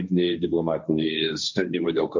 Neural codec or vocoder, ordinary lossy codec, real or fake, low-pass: codec, 16 kHz, 1.1 kbps, Voila-Tokenizer; MP3, 48 kbps; fake; 7.2 kHz